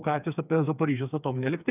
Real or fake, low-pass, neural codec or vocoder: fake; 3.6 kHz; codec, 16 kHz, 4 kbps, FreqCodec, smaller model